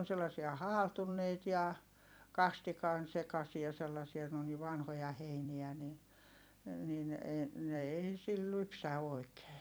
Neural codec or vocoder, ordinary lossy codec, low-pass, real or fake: vocoder, 44.1 kHz, 128 mel bands every 256 samples, BigVGAN v2; none; none; fake